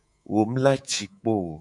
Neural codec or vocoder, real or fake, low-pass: codec, 24 kHz, 3.1 kbps, DualCodec; fake; 10.8 kHz